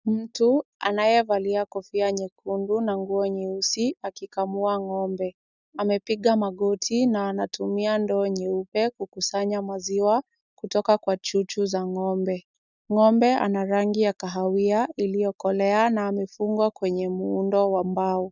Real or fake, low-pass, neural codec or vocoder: real; 7.2 kHz; none